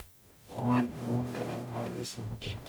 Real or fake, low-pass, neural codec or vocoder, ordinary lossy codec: fake; none; codec, 44.1 kHz, 0.9 kbps, DAC; none